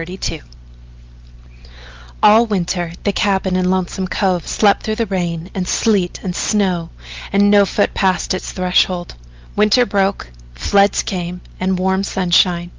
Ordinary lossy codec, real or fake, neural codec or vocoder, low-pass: Opus, 32 kbps; real; none; 7.2 kHz